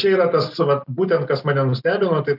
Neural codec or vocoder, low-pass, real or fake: none; 5.4 kHz; real